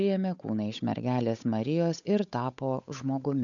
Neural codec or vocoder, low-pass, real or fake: codec, 16 kHz, 8 kbps, FunCodec, trained on Chinese and English, 25 frames a second; 7.2 kHz; fake